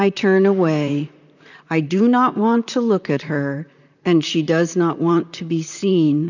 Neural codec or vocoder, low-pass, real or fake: vocoder, 44.1 kHz, 128 mel bands, Pupu-Vocoder; 7.2 kHz; fake